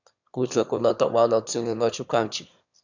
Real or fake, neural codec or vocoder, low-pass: fake; autoencoder, 22.05 kHz, a latent of 192 numbers a frame, VITS, trained on one speaker; 7.2 kHz